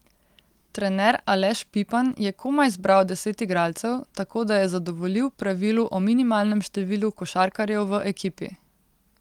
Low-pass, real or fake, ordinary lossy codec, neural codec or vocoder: 19.8 kHz; real; Opus, 24 kbps; none